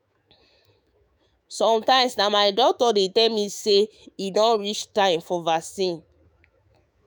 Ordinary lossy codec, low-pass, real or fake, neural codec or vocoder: none; none; fake; autoencoder, 48 kHz, 128 numbers a frame, DAC-VAE, trained on Japanese speech